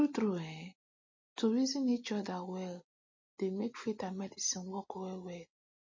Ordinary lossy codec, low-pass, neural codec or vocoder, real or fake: MP3, 32 kbps; 7.2 kHz; none; real